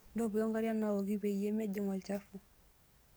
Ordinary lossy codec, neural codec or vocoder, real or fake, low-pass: none; codec, 44.1 kHz, 7.8 kbps, DAC; fake; none